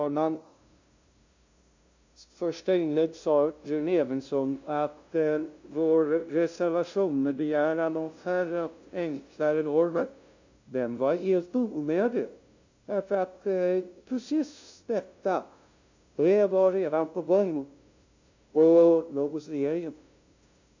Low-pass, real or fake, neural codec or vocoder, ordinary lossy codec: 7.2 kHz; fake; codec, 16 kHz, 0.5 kbps, FunCodec, trained on LibriTTS, 25 frames a second; AAC, 48 kbps